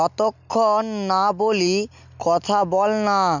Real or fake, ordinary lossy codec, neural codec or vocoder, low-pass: real; none; none; 7.2 kHz